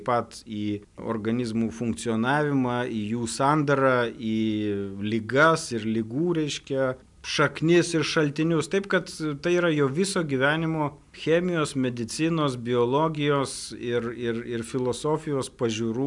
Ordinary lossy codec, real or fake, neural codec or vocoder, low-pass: MP3, 96 kbps; real; none; 10.8 kHz